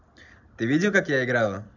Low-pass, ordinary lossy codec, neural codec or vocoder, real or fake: 7.2 kHz; none; none; real